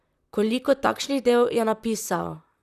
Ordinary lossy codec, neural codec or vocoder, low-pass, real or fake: none; vocoder, 44.1 kHz, 128 mel bands, Pupu-Vocoder; 14.4 kHz; fake